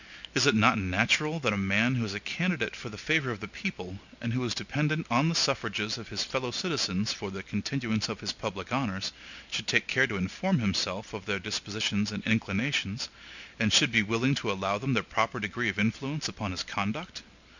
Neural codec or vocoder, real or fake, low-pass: none; real; 7.2 kHz